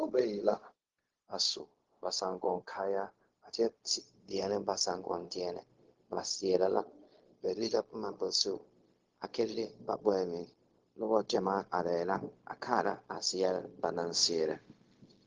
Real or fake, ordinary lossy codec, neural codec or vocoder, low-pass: fake; Opus, 16 kbps; codec, 16 kHz, 0.4 kbps, LongCat-Audio-Codec; 7.2 kHz